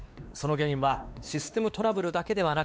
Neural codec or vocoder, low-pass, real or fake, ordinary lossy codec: codec, 16 kHz, 2 kbps, X-Codec, WavLM features, trained on Multilingual LibriSpeech; none; fake; none